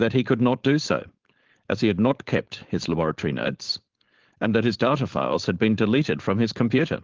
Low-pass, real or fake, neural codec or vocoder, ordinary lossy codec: 7.2 kHz; fake; codec, 16 kHz, 4.8 kbps, FACodec; Opus, 16 kbps